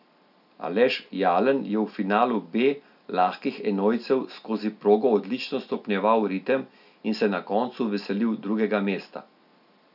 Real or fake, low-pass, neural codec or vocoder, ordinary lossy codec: real; 5.4 kHz; none; none